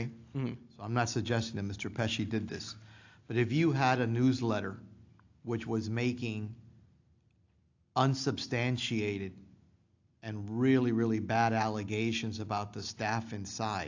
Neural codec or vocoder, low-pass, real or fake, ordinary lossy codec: none; 7.2 kHz; real; AAC, 48 kbps